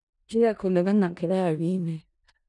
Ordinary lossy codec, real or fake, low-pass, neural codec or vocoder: none; fake; 10.8 kHz; codec, 16 kHz in and 24 kHz out, 0.4 kbps, LongCat-Audio-Codec, four codebook decoder